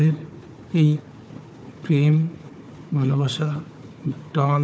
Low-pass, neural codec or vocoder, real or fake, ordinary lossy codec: none; codec, 16 kHz, 4 kbps, FunCodec, trained on Chinese and English, 50 frames a second; fake; none